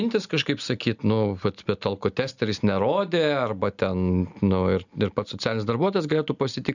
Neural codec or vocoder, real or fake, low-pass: none; real; 7.2 kHz